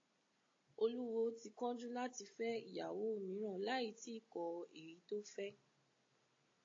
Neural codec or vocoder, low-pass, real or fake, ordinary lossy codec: none; 7.2 kHz; real; AAC, 32 kbps